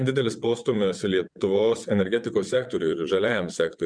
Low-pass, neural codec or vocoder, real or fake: 9.9 kHz; vocoder, 22.05 kHz, 80 mel bands, WaveNeXt; fake